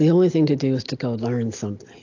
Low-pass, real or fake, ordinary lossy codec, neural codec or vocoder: 7.2 kHz; real; AAC, 48 kbps; none